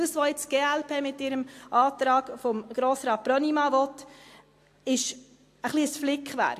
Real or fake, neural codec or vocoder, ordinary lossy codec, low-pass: real; none; AAC, 64 kbps; 14.4 kHz